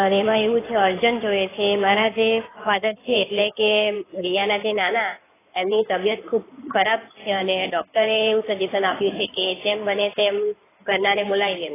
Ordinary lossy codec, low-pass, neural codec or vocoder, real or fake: AAC, 16 kbps; 3.6 kHz; codec, 16 kHz in and 24 kHz out, 2.2 kbps, FireRedTTS-2 codec; fake